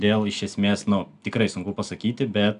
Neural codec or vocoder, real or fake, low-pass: none; real; 10.8 kHz